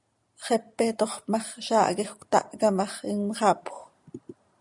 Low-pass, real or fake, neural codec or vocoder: 10.8 kHz; real; none